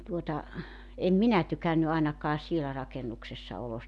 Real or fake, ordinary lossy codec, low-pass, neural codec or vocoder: real; none; none; none